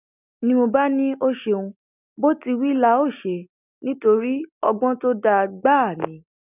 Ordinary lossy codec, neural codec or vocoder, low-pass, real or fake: none; none; 3.6 kHz; real